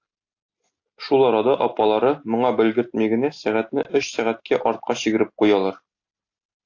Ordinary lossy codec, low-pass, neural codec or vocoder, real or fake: AAC, 48 kbps; 7.2 kHz; none; real